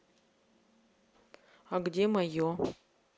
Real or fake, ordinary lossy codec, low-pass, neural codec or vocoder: real; none; none; none